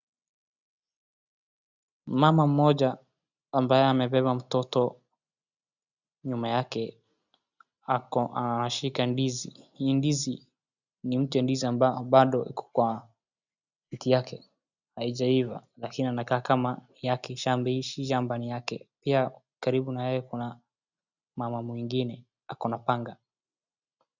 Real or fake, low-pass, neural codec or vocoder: real; 7.2 kHz; none